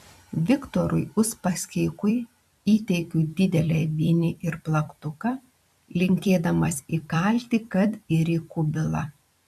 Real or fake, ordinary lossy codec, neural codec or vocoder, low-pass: fake; MP3, 96 kbps; vocoder, 44.1 kHz, 128 mel bands every 256 samples, BigVGAN v2; 14.4 kHz